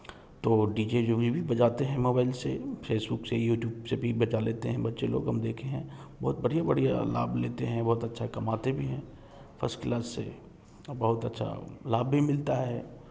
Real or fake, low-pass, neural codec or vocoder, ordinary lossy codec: real; none; none; none